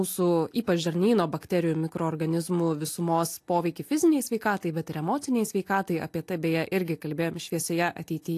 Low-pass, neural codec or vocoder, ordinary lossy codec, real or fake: 14.4 kHz; none; AAC, 64 kbps; real